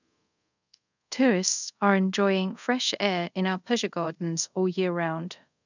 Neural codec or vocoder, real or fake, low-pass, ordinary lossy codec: codec, 24 kHz, 0.5 kbps, DualCodec; fake; 7.2 kHz; none